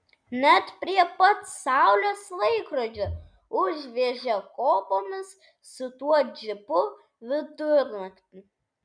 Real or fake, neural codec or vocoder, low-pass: real; none; 9.9 kHz